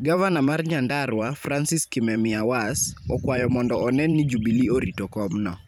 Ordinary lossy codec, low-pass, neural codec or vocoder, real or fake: none; 19.8 kHz; vocoder, 44.1 kHz, 128 mel bands every 512 samples, BigVGAN v2; fake